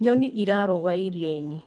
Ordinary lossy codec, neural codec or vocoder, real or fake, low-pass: none; codec, 24 kHz, 1.5 kbps, HILCodec; fake; 9.9 kHz